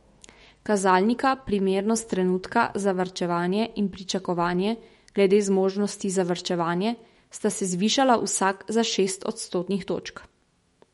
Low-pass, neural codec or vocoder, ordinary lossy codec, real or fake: 19.8 kHz; autoencoder, 48 kHz, 128 numbers a frame, DAC-VAE, trained on Japanese speech; MP3, 48 kbps; fake